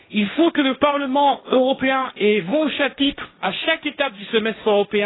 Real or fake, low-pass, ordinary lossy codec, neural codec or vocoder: fake; 7.2 kHz; AAC, 16 kbps; codec, 16 kHz, 1.1 kbps, Voila-Tokenizer